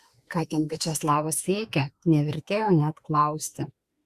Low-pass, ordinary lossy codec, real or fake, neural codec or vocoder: 14.4 kHz; Opus, 64 kbps; fake; autoencoder, 48 kHz, 32 numbers a frame, DAC-VAE, trained on Japanese speech